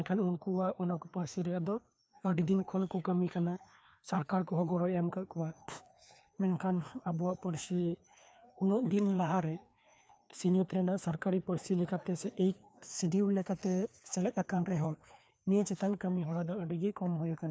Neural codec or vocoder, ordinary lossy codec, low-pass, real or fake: codec, 16 kHz, 2 kbps, FreqCodec, larger model; none; none; fake